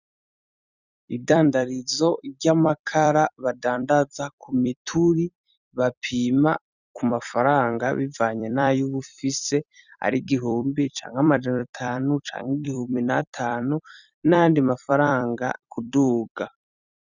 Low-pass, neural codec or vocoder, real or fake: 7.2 kHz; vocoder, 44.1 kHz, 128 mel bands every 256 samples, BigVGAN v2; fake